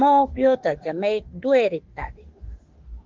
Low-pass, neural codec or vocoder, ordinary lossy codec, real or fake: 7.2 kHz; autoencoder, 48 kHz, 32 numbers a frame, DAC-VAE, trained on Japanese speech; Opus, 16 kbps; fake